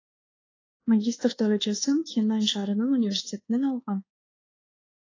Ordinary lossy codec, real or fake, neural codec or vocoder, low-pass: AAC, 32 kbps; fake; codec, 24 kHz, 1.2 kbps, DualCodec; 7.2 kHz